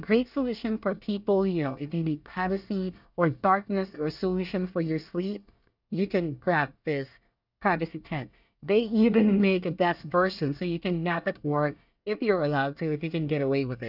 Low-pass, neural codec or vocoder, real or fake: 5.4 kHz; codec, 24 kHz, 1 kbps, SNAC; fake